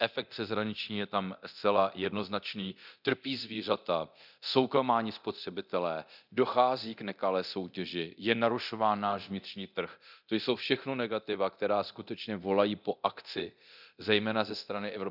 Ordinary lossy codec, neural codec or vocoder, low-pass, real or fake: none; codec, 24 kHz, 0.9 kbps, DualCodec; 5.4 kHz; fake